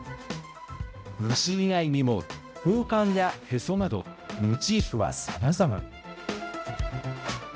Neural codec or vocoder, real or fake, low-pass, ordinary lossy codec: codec, 16 kHz, 0.5 kbps, X-Codec, HuBERT features, trained on balanced general audio; fake; none; none